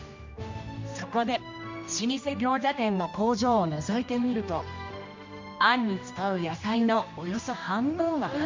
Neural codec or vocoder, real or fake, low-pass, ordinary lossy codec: codec, 16 kHz, 1 kbps, X-Codec, HuBERT features, trained on general audio; fake; 7.2 kHz; MP3, 64 kbps